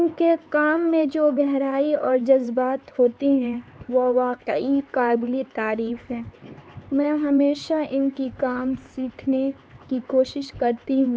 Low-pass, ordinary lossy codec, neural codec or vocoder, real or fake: none; none; codec, 16 kHz, 4 kbps, X-Codec, HuBERT features, trained on LibriSpeech; fake